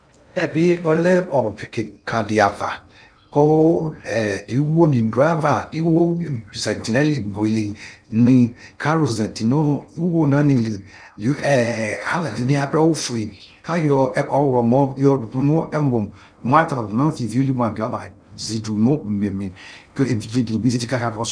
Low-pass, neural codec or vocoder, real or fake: 9.9 kHz; codec, 16 kHz in and 24 kHz out, 0.6 kbps, FocalCodec, streaming, 2048 codes; fake